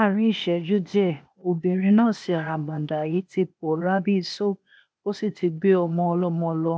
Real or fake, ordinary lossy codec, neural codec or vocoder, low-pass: fake; none; codec, 16 kHz, 0.8 kbps, ZipCodec; none